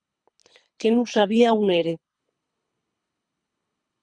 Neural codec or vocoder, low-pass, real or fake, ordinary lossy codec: codec, 24 kHz, 3 kbps, HILCodec; 9.9 kHz; fake; Opus, 64 kbps